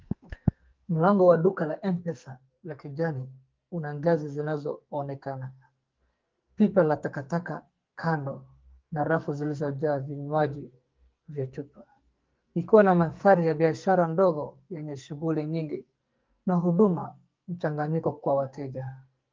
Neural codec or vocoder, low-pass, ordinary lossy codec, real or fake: codec, 44.1 kHz, 2.6 kbps, SNAC; 7.2 kHz; Opus, 24 kbps; fake